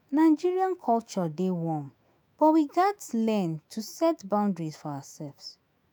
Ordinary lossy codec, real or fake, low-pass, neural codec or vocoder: none; fake; none; autoencoder, 48 kHz, 128 numbers a frame, DAC-VAE, trained on Japanese speech